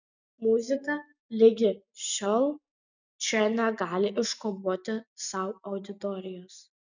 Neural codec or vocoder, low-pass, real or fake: none; 7.2 kHz; real